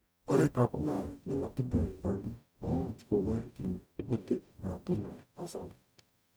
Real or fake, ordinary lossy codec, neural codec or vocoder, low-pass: fake; none; codec, 44.1 kHz, 0.9 kbps, DAC; none